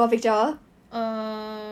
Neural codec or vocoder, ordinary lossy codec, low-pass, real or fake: none; none; 19.8 kHz; real